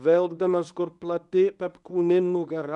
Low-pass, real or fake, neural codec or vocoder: 10.8 kHz; fake; codec, 24 kHz, 0.9 kbps, WavTokenizer, medium speech release version 1